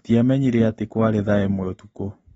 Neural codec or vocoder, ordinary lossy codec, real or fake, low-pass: none; AAC, 24 kbps; real; 19.8 kHz